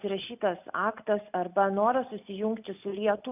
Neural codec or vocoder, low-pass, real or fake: none; 3.6 kHz; real